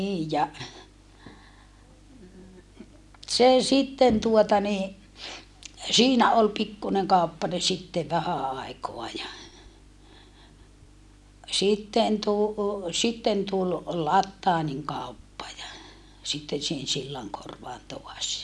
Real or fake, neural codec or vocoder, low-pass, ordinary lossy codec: real; none; none; none